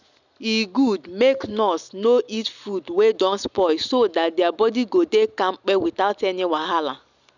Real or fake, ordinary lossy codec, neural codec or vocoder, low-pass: real; none; none; 7.2 kHz